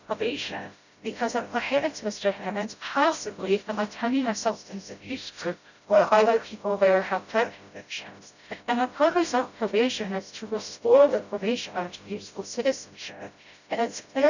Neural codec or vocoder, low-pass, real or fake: codec, 16 kHz, 0.5 kbps, FreqCodec, smaller model; 7.2 kHz; fake